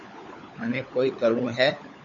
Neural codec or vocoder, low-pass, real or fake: codec, 16 kHz, 16 kbps, FunCodec, trained on LibriTTS, 50 frames a second; 7.2 kHz; fake